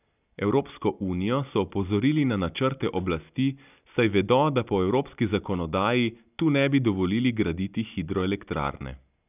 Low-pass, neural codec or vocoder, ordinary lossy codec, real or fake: 3.6 kHz; none; none; real